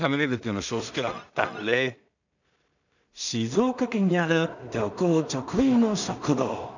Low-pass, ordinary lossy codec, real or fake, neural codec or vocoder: 7.2 kHz; none; fake; codec, 16 kHz in and 24 kHz out, 0.4 kbps, LongCat-Audio-Codec, two codebook decoder